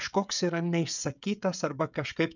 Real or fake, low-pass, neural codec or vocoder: fake; 7.2 kHz; codec, 16 kHz, 16 kbps, FreqCodec, smaller model